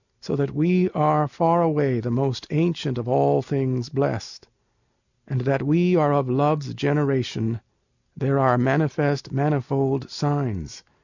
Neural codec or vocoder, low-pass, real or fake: none; 7.2 kHz; real